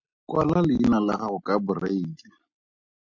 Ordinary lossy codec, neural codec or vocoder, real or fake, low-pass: MP3, 64 kbps; none; real; 7.2 kHz